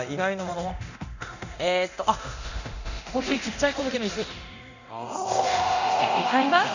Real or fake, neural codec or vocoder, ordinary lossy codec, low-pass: fake; codec, 24 kHz, 0.9 kbps, DualCodec; none; 7.2 kHz